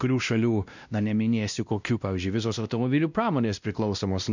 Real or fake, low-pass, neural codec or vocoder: fake; 7.2 kHz; codec, 16 kHz, 1 kbps, X-Codec, WavLM features, trained on Multilingual LibriSpeech